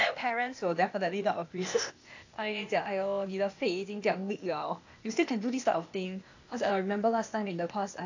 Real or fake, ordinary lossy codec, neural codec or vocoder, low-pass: fake; AAC, 48 kbps; codec, 16 kHz, 0.8 kbps, ZipCodec; 7.2 kHz